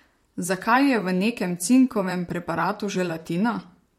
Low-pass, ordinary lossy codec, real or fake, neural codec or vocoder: 19.8 kHz; MP3, 64 kbps; fake; vocoder, 44.1 kHz, 128 mel bands, Pupu-Vocoder